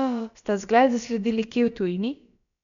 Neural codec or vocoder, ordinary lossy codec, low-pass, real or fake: codec, 16 kHz, about 1 kbps, DyCAST, with the encoder's durations; none; 7.2 kHz; fake